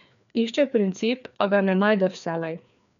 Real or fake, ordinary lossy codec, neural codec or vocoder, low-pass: fake; none; codec, 16 kHz, 2 kbps, FreqCodec, larger model; 7.2 kHz